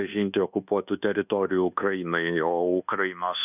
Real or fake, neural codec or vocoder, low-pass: fake; codec, 24 kHz, 1.2 kbps, DualCodec; 3.6 kHz